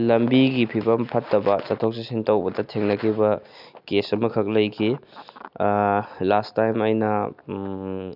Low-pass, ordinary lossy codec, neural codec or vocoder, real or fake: 5.4 kHz; none; none; real